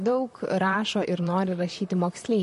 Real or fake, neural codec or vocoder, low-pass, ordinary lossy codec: fake; vocoder, 44.1 kHz, 128 mel bands, Pupu-Vocoder; 14.4 kHz; MP3, 48 kbps